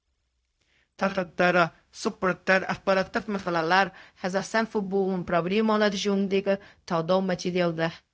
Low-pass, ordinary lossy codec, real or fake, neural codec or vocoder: none; none; fake; codec, 16 kHz, 0.4 kbps, LongCat-Audio-Codec